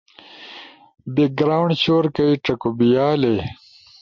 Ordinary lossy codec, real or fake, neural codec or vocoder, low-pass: MP3, 64 kbps; real; none; 7.2 kHz